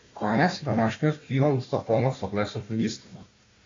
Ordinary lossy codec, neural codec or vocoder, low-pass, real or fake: AAC, 32 kbps; codec, 16 kHz, 1 kbps, FunCodec, trained on Chinese and English, 50 frames a second; 7.2 kHz; fake